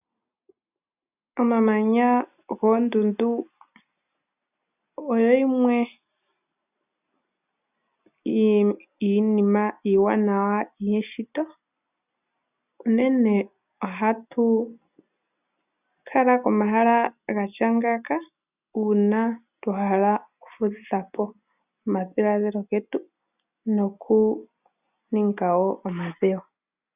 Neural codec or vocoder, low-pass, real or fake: none; 3.6 kHz; real